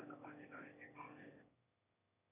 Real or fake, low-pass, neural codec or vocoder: fake; 3.6 kHz; autoencoder, 22.05 kHz, a latent of 192 numbers a frame, VITS, trained on one speaker